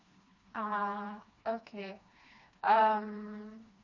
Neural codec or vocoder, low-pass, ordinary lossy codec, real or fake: codec, 16 kHz, 2 kbps, FreqCodec, smaller model; 7.2 kHz; Opus, 64 kbps; fake